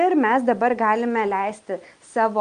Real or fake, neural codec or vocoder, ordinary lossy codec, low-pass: real; none; Opus, 32 kbps; 9.9 kHz